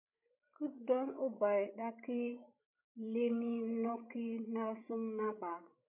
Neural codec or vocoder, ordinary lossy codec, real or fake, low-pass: codec, 16 kHz, 16 kbps, FreqCodec, larger model; MP3, 32 kbps; fake; 3.6 kHz